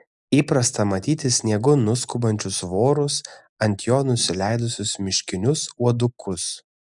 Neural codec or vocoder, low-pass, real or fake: none; 10.8 kHz; real